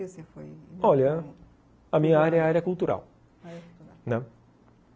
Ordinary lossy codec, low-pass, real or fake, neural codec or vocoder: none; none; real; none